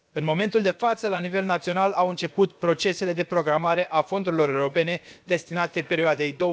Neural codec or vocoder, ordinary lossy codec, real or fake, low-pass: codec, 16 kHz, about 1 kbps, DyCAST, with the encoder's durations; none; fake; none